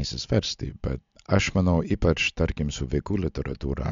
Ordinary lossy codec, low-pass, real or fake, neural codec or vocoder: MP3, 64 kbps; 7.2 kHz; real; none